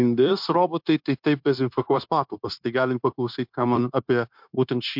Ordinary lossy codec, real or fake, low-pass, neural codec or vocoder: MP3, 48 kbps; fake; 5.4 kHz; codec, 16 kHz, 0.9 kbps, LongCat-Audio-Codec